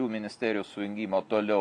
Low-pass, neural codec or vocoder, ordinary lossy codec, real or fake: 10.8 kHz; none; AAC, 64 kbps; real